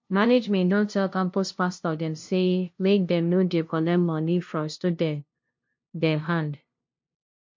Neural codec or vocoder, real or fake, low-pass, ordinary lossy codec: codec, 16 kHz, 0.5 kbps, FunCodec, trained on LibriTTS, 25 frames a second; fake; 7.2 kHz; MP3, 48 kbps